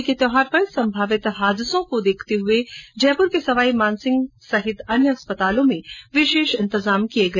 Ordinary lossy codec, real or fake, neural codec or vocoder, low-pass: none; real; none; none